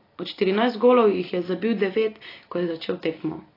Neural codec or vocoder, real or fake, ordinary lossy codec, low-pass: none; real; AAC, 24 kbps; 5.4 kHz